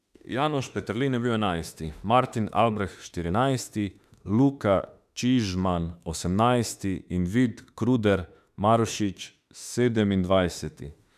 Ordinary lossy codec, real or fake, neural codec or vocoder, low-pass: none; fake; autoencoder, 48 kHz, 32 numbers a frame, DAC-VAE, trained on Japanese speech; 14.4 kHz